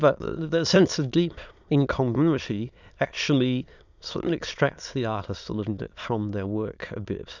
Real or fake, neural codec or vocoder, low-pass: fake; autoencoder, 22.05 kHz, a latent of 192 numbers a frame, VITS, trained on many speakers; 7.2 kHz